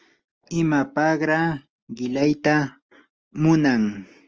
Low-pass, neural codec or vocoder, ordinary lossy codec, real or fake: 7.2 kHz; none; Opus, 24 kbps; real